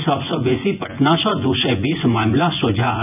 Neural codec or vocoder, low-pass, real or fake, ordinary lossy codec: vocoder, 24 kHz, 100 mel bands, Vocos; 3.6 kHz; fake; none